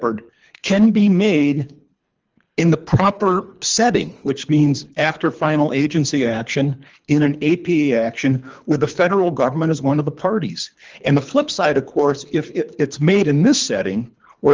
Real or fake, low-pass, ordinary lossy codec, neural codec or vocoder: fake; 7.2 kHz; Opus, 24 kbps; codec, 24 kHz, 3 kbps, HILCodec